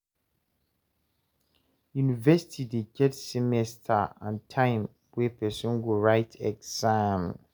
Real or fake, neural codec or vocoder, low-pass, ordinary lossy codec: real; none; none; none